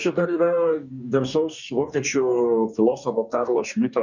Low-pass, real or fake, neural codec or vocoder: 7.2 kHz; fake; codec, 44.1 kHz, 2.6 kbps, DAC